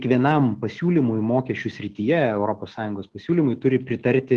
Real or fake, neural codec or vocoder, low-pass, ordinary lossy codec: fake; autoencoder, 48 kHz, 128 numbers a frame, DAC-VAE, trained on Japanese speech; 10.8 kHz; Opus, 16 kbps